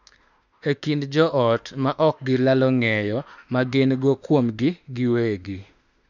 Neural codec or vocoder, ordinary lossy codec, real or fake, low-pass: autoencoder, 48 kHz, 32 numbers a frame, DAC-VAE, trained on Japanese speech; none; fake; 7.2 kHz